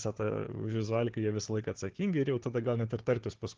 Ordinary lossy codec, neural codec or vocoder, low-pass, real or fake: Opus, 16 kbps; codec, 16 kHz, 4 kbps, FunCodec, trained on Chinese and English, 50 frames a second; 7.2 kHz; fake